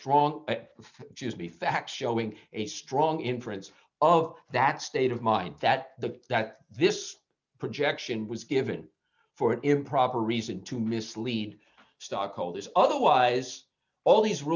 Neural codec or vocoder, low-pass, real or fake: none; 7.2 kHz; real